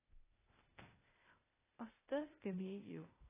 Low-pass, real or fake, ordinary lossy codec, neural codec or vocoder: 3.6 kHz; fake; AAC, 16 kbps; codec, 16 kHz, 0.8 kbps, ZipCodec